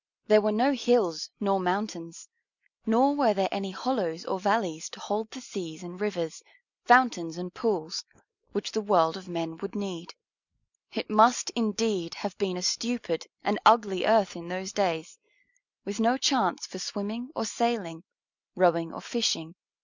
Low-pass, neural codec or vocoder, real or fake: 7.2 kHz; none; real